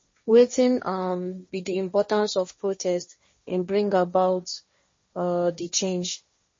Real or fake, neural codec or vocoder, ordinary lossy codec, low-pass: fake; codec, 16 kHz, 1.1 kbps, Voila-Tokenizer; MP3, 32 kbps; 7.2 kHz